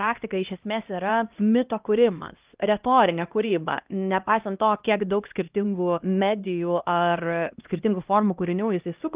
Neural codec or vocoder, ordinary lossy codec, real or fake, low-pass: codec, 16 kHz, 1 kbps, X-Codec, HuBERT features, trained on LibriSpeech; Opus, 32 kbps; fake; 3.6 kHz